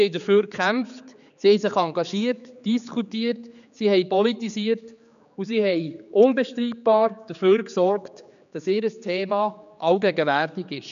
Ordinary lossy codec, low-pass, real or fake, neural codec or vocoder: none; 7.2 kHz; fake; codec, 16 kHz, 4 kbps, X-Codec, HuBERT features, trained on general audio